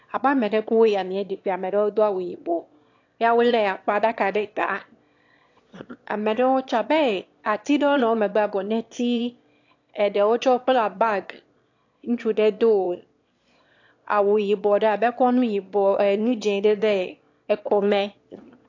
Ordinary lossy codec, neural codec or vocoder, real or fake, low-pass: AAC, 48 kbps; autoencoder, 22.05 kHz, a latent of 192 numbers a frame, VITS, trained on one speaker; fake; 7.2 kHz